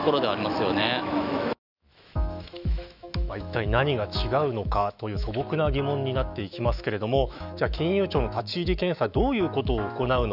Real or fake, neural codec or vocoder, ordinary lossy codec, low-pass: fake; autoencoder, 48 kHz, 128 numbers a frame, DAC-VAE, trained on Japanese speech; none; 5.4 kHz